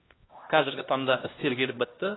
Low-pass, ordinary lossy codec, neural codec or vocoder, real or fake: 7.2 kHz; AAC, 16 kbps; codec, 16 kHz, 1 kbps, X-Codec, HuBERT features, trained on LibriSpeech; fake